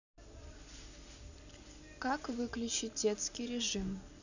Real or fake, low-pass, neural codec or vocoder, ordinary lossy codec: real; 7.2 kHz; none; none